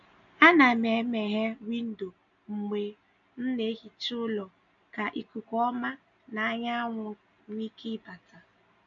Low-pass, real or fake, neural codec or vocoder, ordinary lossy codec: 7.2 kHz; real; none; none